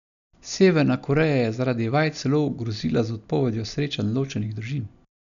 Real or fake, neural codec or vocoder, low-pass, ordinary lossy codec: real; none; 7.2 kHz; none